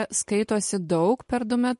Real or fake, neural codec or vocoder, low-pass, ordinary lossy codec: real; none; 14.4 kHz; MP3, 48 kbps